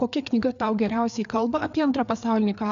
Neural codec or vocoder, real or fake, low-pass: codec, 16 kHz, 4 kbps, FreqCodec, larger model; fake; 7.2 kHz